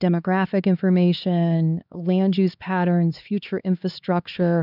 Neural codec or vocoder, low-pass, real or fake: codec, 16 kHz, 2 kbps, X-Codec, HuBERT features, trained on LibriSpeech; 5.4 kHz; fake